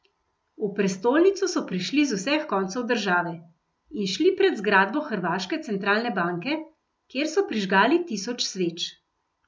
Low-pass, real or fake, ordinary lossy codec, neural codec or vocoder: none; real; none; none